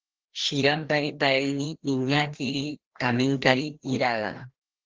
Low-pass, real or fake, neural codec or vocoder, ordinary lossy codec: 7.2 kHz; fake; codec, 16 kHz, 1 kbps, FreqCodec, larger model; Opus, 16 kbps